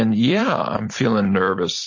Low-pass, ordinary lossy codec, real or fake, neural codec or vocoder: 7.2 kHz; MP3, 32 kbps; fake; vocoder, 44.1 kHz, 128 mel bands every 256 samples, BigVGAN v2